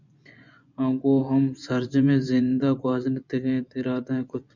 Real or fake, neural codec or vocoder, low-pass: fake; vocoder, 24 kHz, 100 mel bands, Vocos; 7.2 kHz